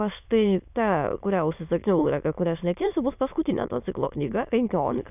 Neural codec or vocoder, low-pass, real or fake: autoencoder, 22.05 kHz, a latent of 192 numbers a frame, VITS, trained on many speakers; 3.6 kHz; fake